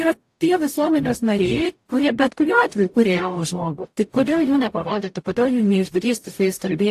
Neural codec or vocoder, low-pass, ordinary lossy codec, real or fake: codec, 44.1 kHz, 0.9 kbps, DAC; 14.4 kHz; AAC, 64 kbps; fake